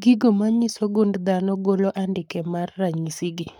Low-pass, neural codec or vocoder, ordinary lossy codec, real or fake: 19.8 kHz; codec, 44.1 kHz, 7.8 kbps, Pupu-Codec; none; fake